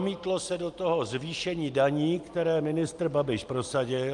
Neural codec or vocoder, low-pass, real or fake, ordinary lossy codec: none; 10.8 kHz; real; Opus, 32 kbps